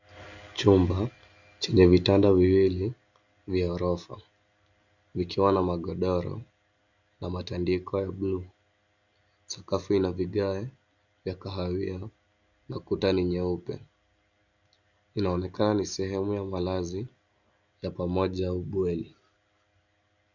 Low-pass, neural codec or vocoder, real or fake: 7.2 kHz; none; real